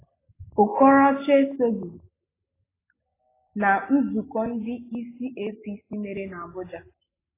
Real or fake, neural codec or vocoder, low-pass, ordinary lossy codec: real; none; 3.6 kHz; AAC, 16 kbps